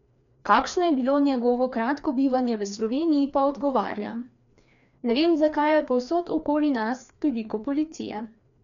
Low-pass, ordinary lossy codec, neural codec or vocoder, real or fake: 7.2 kHz; none; codec, 16 kHz, 2 kbps, FreqCodec, larger model; fake